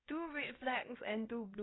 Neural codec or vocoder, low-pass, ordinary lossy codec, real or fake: codec, 16 kHz, about 1 kbps, DyCAST, with the encoder's durations; 7.2 kHz; AAC, 16 kbps; fake